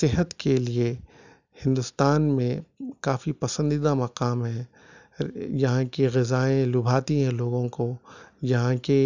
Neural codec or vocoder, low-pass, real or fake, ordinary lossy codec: none; 7.2 kHz; real; none